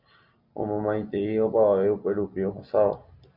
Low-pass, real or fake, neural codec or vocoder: 5.4 kHz; real; none